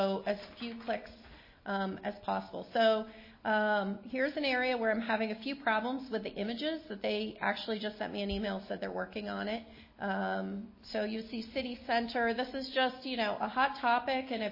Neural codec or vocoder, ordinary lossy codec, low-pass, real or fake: none; MP3, 24 kbps; 5.4 kHz; real